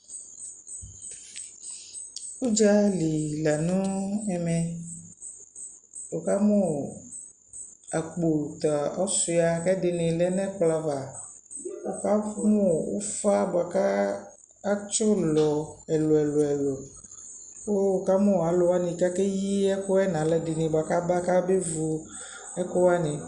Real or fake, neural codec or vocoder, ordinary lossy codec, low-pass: real; none; Opus, 64 kbps; 9.9 kHz